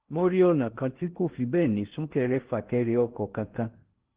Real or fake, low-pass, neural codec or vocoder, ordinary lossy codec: fake; 3.6 kHz; codec, 16 kHz in and 24 kHz out, 0.6 kbps, FocalCodec, streaming, 2048 codes; Opus, 16 kbps